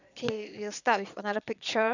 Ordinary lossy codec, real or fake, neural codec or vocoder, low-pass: none; fake; vocoder, 22.05 kHz, 80 mel bands, Vocos; 7.2 kHz